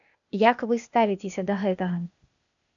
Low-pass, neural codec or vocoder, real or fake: 7.2 kHz; codec, 16 kHz, 0.8 kbps, ZipCodec; fake